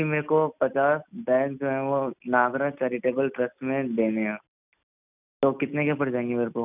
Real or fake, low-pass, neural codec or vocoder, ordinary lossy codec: real; 3.6 kHz; none; none